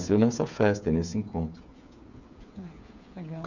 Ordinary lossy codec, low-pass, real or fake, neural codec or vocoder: none; 7.2 kHz; fake; codec, 16 kHz, 8 kbps, FreqCodec, smaller model